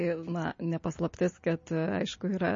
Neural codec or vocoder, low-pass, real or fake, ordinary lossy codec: none; 7.2 kHz; real; MP3, 32 kbps